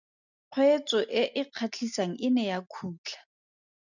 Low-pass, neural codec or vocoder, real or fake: 7.2 kHz; none; real